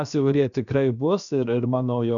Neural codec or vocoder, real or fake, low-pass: codec, 16 kHz, about 1 kbps, DyCAST, with the encoder's durations; fake; 7.2 kHz